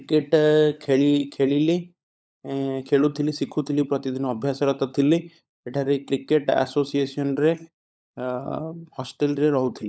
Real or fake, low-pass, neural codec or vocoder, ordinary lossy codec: fake; none; codec, 16 kHz, 16 kbps, FunCodec, trained on LibriTTS, 50 frames a second; none